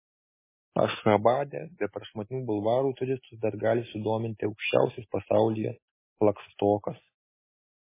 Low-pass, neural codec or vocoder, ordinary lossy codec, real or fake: 3.6 kHz; none; MP3, 16 kbps; real